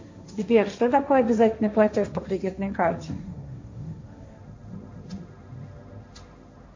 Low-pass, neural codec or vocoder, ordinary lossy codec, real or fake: 7.2 kHz; codec, 16 kHz, 1.1 kbps, Voila-Tokenizer; MP3, 48 kbps; fake